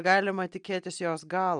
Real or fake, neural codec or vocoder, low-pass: real; none; 9.9 kHz